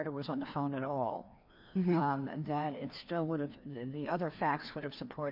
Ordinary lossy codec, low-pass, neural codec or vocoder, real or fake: MP3, 48 kbps; 5.4 kHz; codec, 16 kHz, 2 kbps, FreqCodec, larger model; fake